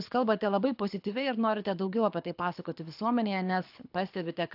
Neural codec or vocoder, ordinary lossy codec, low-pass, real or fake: codec, 24 kHz, 6 kbps, HILCodec; AAC, 48 kbps; 5.4 kHz; fake